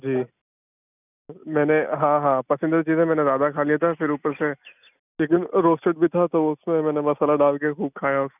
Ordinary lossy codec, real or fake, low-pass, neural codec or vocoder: none; real; 3.6 kHz; none